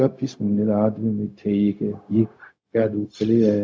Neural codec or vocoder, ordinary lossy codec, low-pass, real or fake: codec, 16 kHz, 0.4 kbps, LongCat-Audio-Codec; none; none; fake